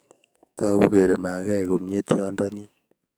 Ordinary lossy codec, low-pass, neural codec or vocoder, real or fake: none; none; codec, 44.1 kHz, 2.6 kbps, SNAC; fake